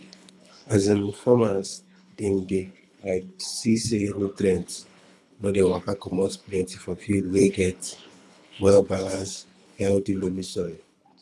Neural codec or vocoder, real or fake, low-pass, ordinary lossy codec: codec, 24 kHz, 3 kbps, HILCodec; fake; none; none